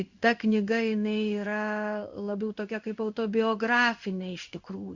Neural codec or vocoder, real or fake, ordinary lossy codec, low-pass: codec, 16 kHz in and 24 kHz out, 1 kbps, XY-Tokenizer; fake; Opus, 64 kbps; 7.2 kHz